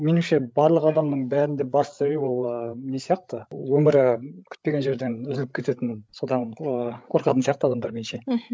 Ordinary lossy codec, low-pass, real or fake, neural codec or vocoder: none; none; fake; codec, 16 kHz, 8 kbps, FreqCodec, larger model